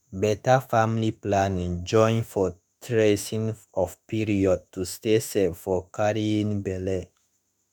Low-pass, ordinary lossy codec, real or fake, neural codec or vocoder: none; none; fake; autoencoder, 48 kHz, 32 numbers a frame, DAC-VAE, trained on Japanese speech